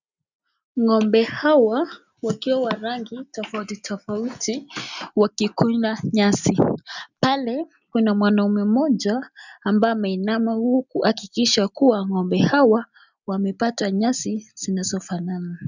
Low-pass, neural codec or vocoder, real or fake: 7.2 kHz; none; real